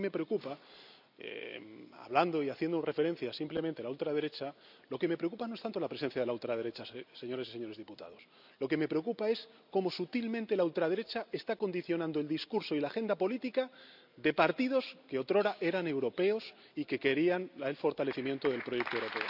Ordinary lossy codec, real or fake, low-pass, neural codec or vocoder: none; real; 5.4 kHz; none